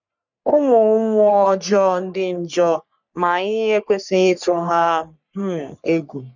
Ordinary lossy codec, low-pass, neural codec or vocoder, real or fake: none; 7.2 kHz; codec, 44.1 kHz, 3.4 kbps, Pupu-Codec; fake